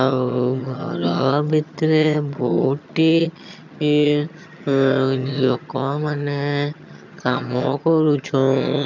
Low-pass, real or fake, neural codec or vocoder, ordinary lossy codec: 7.2 kHz; fake; vocoder, 22.05 kHz, 80 mel bands, HiFi-GAN; none